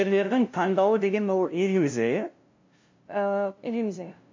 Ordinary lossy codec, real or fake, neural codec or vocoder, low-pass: MP3, 48 kbps; fake; codec, 16 kHz, 0.5 kbps, FunCodec, trained on LibriTTS, 25 frames a second; 7.2 kHz